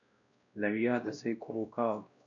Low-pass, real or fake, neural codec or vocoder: 7.2 kHz; fake; codec, 16 kHz, 1 kbps, X-Codec, WavLM features, trained on Multilingual LibriSpeech